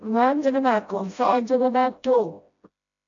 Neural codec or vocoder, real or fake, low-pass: codec, 16 kHz, 0.5 kbps, FreqCodec, smaller model; fake; 7.2 kHz